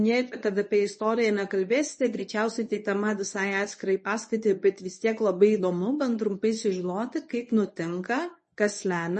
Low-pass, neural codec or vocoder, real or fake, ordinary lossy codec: 10.8 kHz; codec, 24 kHz, 0.9 kbps, WavTokenizer, medium speech release version 1; fake; MP3, 32 kbps